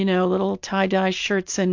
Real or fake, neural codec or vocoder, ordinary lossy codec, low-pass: real; none; MP3, 48 kbps; 7.2 kHz